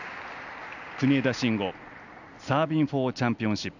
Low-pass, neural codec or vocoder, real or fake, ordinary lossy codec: 7.2 kHz; none; real; none